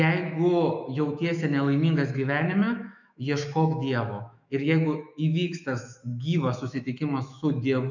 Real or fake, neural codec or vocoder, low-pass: fake; autoencoder, 48 kHz, 128 numbers a frame, DAC-VAE, trained on Japanese speech; 7.2 kHz